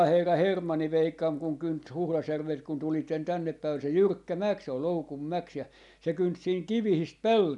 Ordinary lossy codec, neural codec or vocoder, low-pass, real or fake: none; none; 10.8 kHz; real